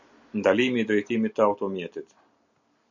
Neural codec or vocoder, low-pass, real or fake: none; 7.2 kHz; real